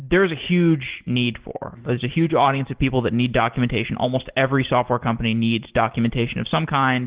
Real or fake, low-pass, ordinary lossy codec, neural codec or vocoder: real; 3.6 kHz; Opus, 16 kbps; none